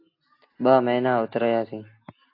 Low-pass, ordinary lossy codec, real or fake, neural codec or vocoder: 5.4 kHz; AAC, 32 kbps; real; none